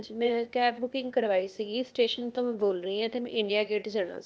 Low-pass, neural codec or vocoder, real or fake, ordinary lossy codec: none; codec, 16 kHz, 0.8 kbps, ZipCodec; fake; none